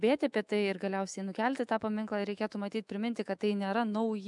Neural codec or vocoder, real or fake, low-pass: autoencoder, 48 kHz, 128 numbers a frame, DAC-VAE, trained on Japanese speech; fake; 10.8 kHz